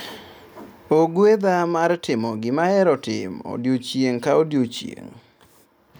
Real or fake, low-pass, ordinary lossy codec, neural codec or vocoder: real; none; none; none